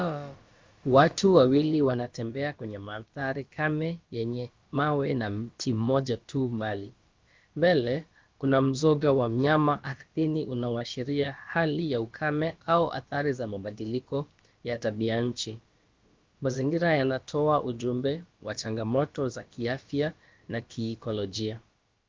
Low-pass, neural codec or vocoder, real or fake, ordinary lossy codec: 7.2 kHz; codec, 16 kHz, about 1 kbps, DyCAST, with the encoder's durations; fake; Opus, 32 kbps